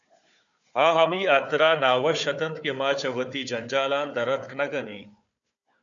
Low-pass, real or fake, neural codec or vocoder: 7.2 kHz; fake; codec, 16 kHz, 4 kbps, FunCodec, trained on Chinese and English, 50 frames a second